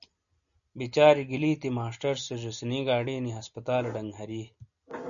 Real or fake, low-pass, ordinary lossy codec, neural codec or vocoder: real; 7.2 kHz; MP3, 96 kbps; none